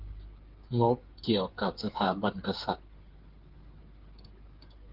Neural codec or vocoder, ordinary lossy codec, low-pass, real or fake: codec, 16 kHz, 8 kbps, FreqCodec, smaller model; Opus, 16 kbps; 5.4 kHz; fake